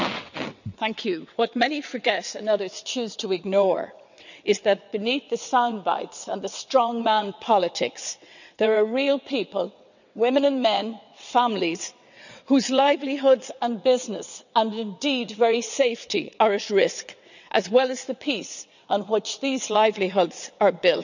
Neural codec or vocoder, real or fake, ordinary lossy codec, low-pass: vocoder, 22.05 kHz, 80 mel bands, WaveNeXt; fake; none; 7.2 kHz